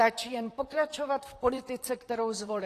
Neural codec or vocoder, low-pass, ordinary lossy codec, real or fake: vocoder, 44.1 kHz, 128 mel bands, Pupu-Vocoder; 14.4 kHz; AAC, 48 kbps; fake